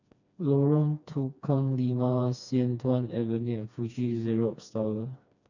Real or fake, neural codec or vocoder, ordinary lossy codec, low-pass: fake; codec, 16 kHz, 2 kbps, FreqCodec, smaller model; none; 7.2 kHz